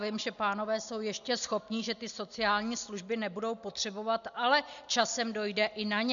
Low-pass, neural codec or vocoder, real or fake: 7.2 kHz; none; real